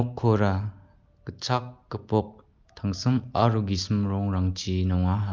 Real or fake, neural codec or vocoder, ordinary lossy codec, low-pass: real; none; Opus, 32 kbps; 7.2 kHz